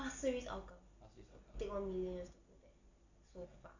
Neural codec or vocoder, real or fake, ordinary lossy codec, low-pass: none; real; none; 7.2 kHz